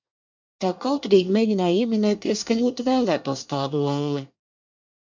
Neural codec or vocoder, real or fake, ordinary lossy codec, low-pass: codec, 24 kHz, 1 kbps, SNAC; fake; MP3, 64 kbps; 7.2 kHz